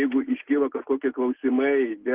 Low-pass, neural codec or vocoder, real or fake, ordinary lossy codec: 3.6 kHz; none; real; Opus, 16 kbps